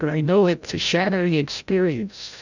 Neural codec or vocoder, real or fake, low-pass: codec, 16 kHz, 0.5 kbps, FreqCodec, larger model; fake; 7.2 kHz